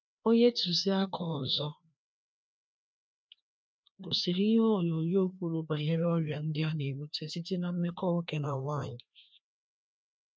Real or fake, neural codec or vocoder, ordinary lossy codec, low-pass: fake; codec, 16 kHz, 2 kbps, FreqCodec, larger model; none; none